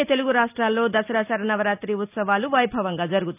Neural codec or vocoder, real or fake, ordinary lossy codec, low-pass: none; real; none; 3.6 kHz